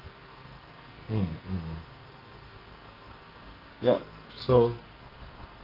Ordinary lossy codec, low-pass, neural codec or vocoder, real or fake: Opus, 24 kbps; 5.4 kHz; codec, 44.1 kHz, 2.6 kbps, SNAC; fake